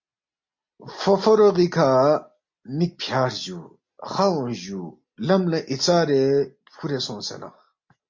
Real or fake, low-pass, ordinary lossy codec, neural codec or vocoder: real; 7.2 kHz; MP3, 48 kbps; none